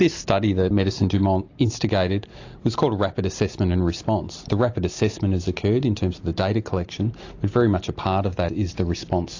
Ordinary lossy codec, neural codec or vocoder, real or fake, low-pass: AAC, 48 kbps; none; real; 7.2 kHz